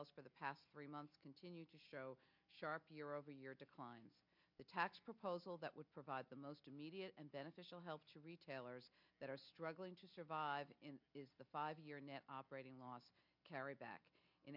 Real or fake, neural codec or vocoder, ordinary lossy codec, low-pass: real; none; MP3, 48 kbps; 5.4 kHz